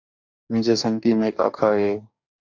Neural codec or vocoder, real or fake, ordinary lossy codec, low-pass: codec, 44.1 kHz, 2.6 kbps, DAC; fake; AAC, 48 kbps; 7.2 kHz